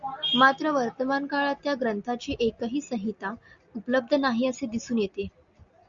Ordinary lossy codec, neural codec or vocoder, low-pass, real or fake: Opus, 64 kbps; none; 7.2 kHz; real